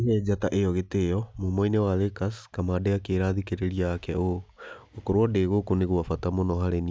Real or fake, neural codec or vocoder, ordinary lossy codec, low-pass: real; none; none; none